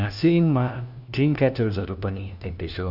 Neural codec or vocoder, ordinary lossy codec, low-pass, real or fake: codec, 16 kHz, 1 kbps, FunCodec, trained on LibriTTS, 50 frames a second; none; 5.4 kHz; fake